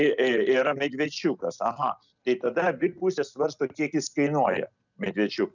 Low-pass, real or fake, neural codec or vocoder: 7.2 kHz; real; none